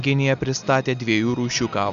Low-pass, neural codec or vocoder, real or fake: 7.2 kHz; none; real